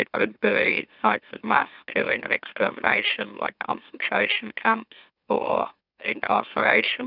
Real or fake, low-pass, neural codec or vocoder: fake; 5.4 kHz; autoencoder, 44.1 kHz, a latent of 192 numbers a frame, MeloTTS